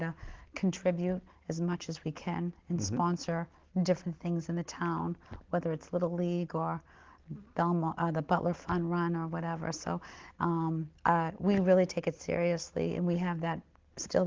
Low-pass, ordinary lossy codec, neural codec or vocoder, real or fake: 7.2 kHz; Opus, 16 kbps; none; real